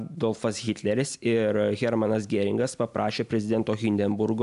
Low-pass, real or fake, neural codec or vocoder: 10.8 kHz; real; none